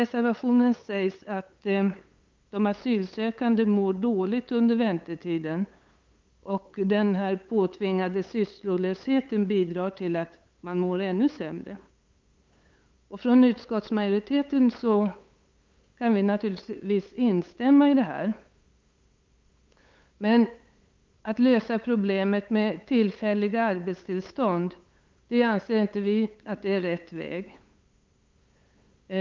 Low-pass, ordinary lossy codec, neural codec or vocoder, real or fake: 7.2 kHz; Opus, 24 kbps; codec, 16 kHz, 8 kbps, FunCodec, trained on LibriTTS, 25 frames a second; fake